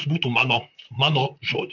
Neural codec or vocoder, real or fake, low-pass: vocoder, 44.1 kHz, 128 mel bands, Pupu-Vocoder; fake; 7.2 kHz